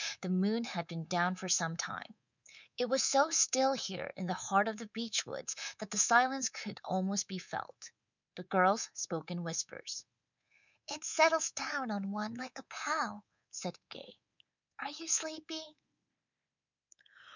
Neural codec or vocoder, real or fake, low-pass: codec, 24 kHz, 3.1 kbps, DualCodec; fake; 7.2 kHz